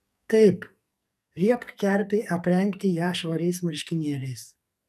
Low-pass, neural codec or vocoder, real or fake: 14.4 kHz; codec, 44.1 kHz, 2.6 kbps, SNAC; fake